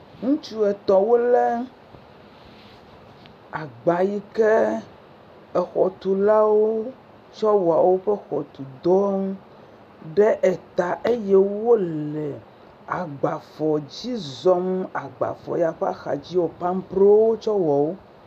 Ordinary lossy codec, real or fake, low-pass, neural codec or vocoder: MP3, 96 kbps; real; 14.4 kHz; none